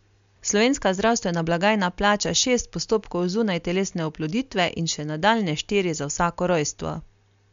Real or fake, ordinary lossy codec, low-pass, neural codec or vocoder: real; MP3, 64 kbps; 7.2 kHz; none